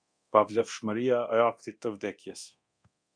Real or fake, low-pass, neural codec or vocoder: fake; 9.9 kHz; codec, 24 kHz, 0.9 kbps, DualCodec